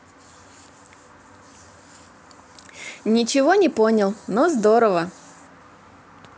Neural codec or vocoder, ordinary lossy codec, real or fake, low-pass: none; none; real; none